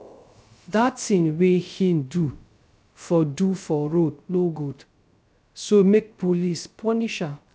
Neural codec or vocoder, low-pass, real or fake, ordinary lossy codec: codec, 16 kHz, 0.3 kbps, FocalCodec; none; fake; none